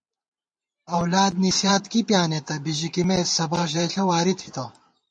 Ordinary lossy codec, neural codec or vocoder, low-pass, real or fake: MP3, 48 kbps; vocoder, 44.1 kHz, 128 mel bands every 512 samples, BigVGAN v2; 9.9 kHz; fake